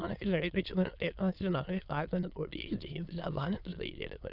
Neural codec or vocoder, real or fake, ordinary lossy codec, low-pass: autoencoder, 22.05 kHz, a latent of 192 numbers a frame, VITS, trained on many speakers; fake; none; 5.4 kHz